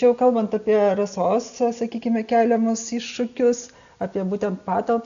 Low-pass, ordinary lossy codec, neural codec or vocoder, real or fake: 7.2 kHz; AAC, 96 kbps; none; real